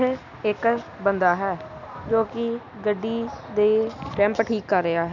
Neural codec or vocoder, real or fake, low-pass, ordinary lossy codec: none; real; 7.2 kHz; none